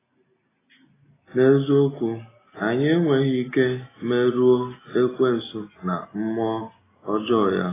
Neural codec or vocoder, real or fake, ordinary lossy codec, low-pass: none; real; AAC, 16 kbps; 3.6 kHz